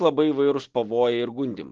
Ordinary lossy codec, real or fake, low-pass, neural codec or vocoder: Opus, 16 kbps; real; 7.2 kHz; none